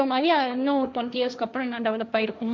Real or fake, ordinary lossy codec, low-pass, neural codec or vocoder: fake; none; none; codec, 16 kHz, 1.1 kbps, Voila-Tokenizer